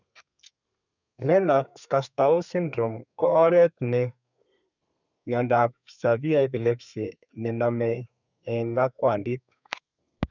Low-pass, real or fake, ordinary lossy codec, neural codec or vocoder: 7.2 kHz; fake; none; codec, 32 kHz, 1.9 kbps, SNAC